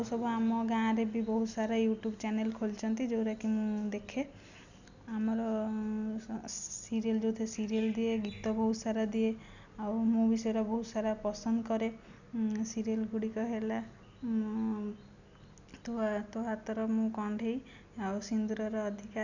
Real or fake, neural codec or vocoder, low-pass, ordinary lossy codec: real; none; 7.2 kHz; none